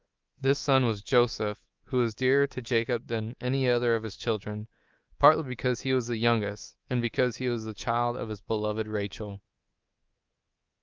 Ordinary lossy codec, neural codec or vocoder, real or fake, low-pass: Opus, 32 kbps; codec, 24 kHz, 3.1 kbps, DualCodec; fake; 7.2 kHz